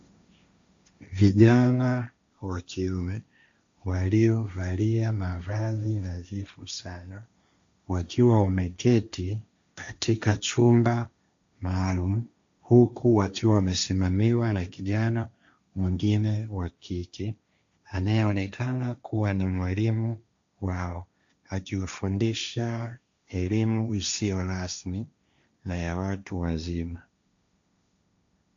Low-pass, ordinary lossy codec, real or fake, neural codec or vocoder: 7.2 kHz; AAC, 64 kbps; fake; codec, 16 kHz, 1.1 kbps, Voila-Tokenizer